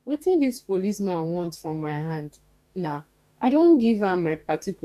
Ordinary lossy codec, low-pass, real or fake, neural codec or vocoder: none; 14.4 kHz; fake; codec, 44.1 kHz, 2.6 kbps, DAC